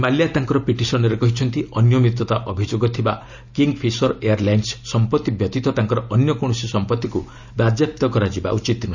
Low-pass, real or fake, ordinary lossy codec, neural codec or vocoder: 7.2 kHz; real; none; none